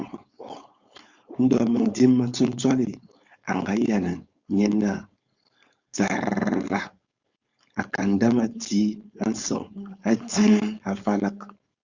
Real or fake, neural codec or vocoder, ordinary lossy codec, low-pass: fake; codec, 16 kHz, 4.8 kbps, FACodec; Opus, 64 kbps; 7.2 kHz